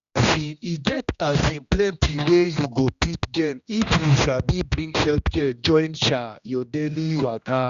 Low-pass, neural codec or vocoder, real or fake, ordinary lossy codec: 7.2 kHz; codec, 16 kHz, 1 kbps, X-Codec, HuBERT features, trained on general audio; fake; Opus, 64 kbps